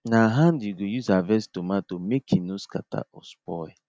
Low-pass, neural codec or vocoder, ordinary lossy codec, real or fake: none; none; none; real